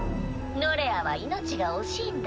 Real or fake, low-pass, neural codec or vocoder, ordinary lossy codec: real; none; none; none